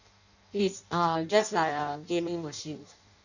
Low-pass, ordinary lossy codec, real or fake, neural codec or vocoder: 7.2 kHz; none; fake; codec, 16 kHz in and 24 kHz out, 0.6 kbps, FireRedTTS-2 codec